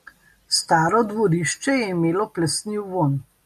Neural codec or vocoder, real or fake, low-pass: none; real; 14.4 kHz